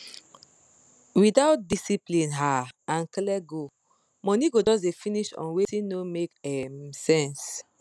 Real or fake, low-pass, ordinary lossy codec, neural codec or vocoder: real; none; none; none